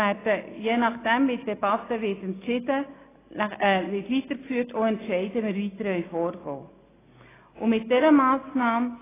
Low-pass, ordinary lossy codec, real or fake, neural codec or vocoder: 3.6 kHz; AAC, 16 kbps; real; none